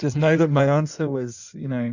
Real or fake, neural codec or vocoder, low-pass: fake; codec, 16 kHz in and 24 kHz out, 1.1 kbps, FireRedTTS-2 codec; 7.2 kHz